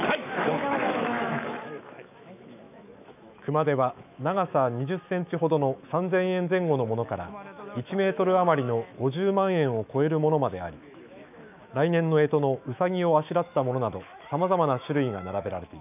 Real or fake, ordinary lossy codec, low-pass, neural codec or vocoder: fake; none; 3.6 kHz; autoencoder, 48 kHz, 128 numbers a frame, DAC-VAE, trained on Japanese speech